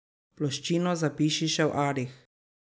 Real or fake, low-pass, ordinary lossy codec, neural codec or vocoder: real; none; none; none